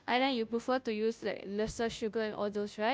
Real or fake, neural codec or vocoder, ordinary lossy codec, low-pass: fake; codec, 16 kHz, 0.5 kbps, FunCodec, trained on Chinese and English, 25 frames a second; none; none